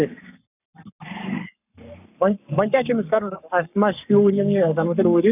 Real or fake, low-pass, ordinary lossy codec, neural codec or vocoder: fake; 3.6 kHz; none; codec, 44.1 kHz, 7.8 kbps, Pupu-Codec